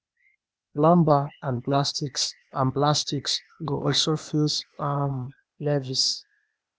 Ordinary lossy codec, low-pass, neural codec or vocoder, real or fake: none; none; codec, 16 kHz, 0.8 kbps, ZipCodec; fake